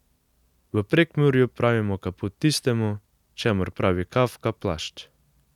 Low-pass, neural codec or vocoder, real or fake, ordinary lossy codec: 19.8 kHz; none; real; none